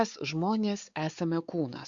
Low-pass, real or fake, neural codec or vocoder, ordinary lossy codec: 7.2 kHz; fake; codec, 16 kHz, 4 kbps, X-Codec, WavLM features, trained on Multilingual LibriSpeech; Opus, 64 kbps